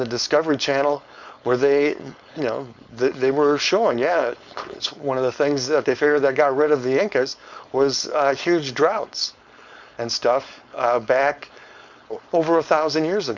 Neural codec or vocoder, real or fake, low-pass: codec, 16 kHz, 4.8 kbps, FACodec; fake; 7.2 kHz